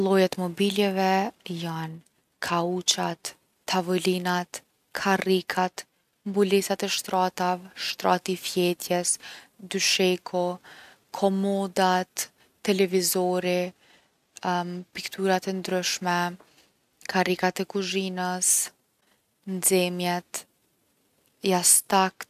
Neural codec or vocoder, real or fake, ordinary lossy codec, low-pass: none; real; none; 14.4 kHz